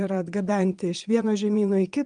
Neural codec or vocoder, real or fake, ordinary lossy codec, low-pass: vocoder, 22.05 kHz, 80 mel bands, Vocos; fake; Opus, 32 kbps; 9.9 kHz